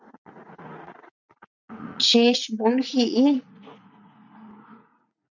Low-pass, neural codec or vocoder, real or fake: 7.2 kHz; vocoder, 44.1 kHz, 80 mel bands, Vocos; fake